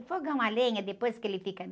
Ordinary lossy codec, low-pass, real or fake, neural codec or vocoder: none; none; real; none